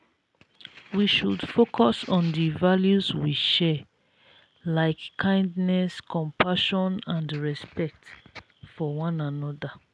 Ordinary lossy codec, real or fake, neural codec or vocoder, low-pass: none; real; none; none